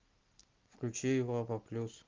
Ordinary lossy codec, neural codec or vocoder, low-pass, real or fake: Opus, 32 kbps; none; 7.2 kHz; real